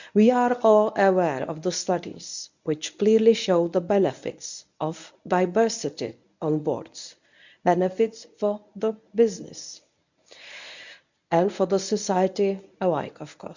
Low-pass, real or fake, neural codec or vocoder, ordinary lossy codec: 7.2 kHz; fake; codec, 24 kHz, 0.9 kbps, WavTokenizer, medium speech release version 1; none